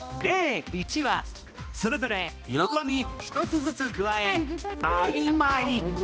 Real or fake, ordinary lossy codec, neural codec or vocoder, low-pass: fake; none; codec, 16 kHz, 1 kbps, X-Codec, HuBERT features, trained on balanced general audio; none